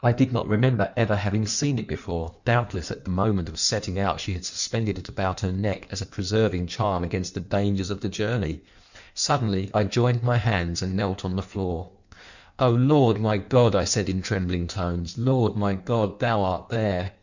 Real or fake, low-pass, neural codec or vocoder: fake; 7.2 kHz; codec, 16 kHz in and 24 kHz out, 1.1 kbps, FireRedTTS-2 codec